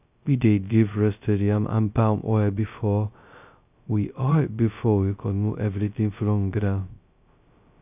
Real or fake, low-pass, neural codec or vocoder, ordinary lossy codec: fake; 3.6 kHz; codec, 16 kHz, 0.2 kbps, FocalCodec; none